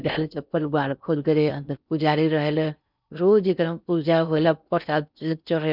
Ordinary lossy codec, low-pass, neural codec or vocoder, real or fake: none; 5.4 kHz; codec, 16 kHz in and 24 kHz out, 0.6 kbps, FocalCodec, streaming, 4096 codes; fake